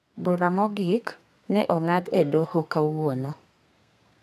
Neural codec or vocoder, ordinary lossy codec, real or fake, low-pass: codec, 32 kHz, 1.9 kbps, SNAC; none; fake; 14.4 kHz